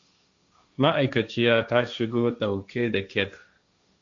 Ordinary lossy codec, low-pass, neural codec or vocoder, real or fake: AAC, 64 kbps; 7.2 kHz; codec, 16 kHz, 1.1 kbps, Voila-Tokenizer; fake